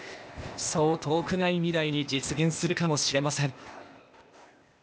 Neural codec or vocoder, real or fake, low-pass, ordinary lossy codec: codec, 16 kHz, 0.8 kbps, ZipCodec; fake; none; none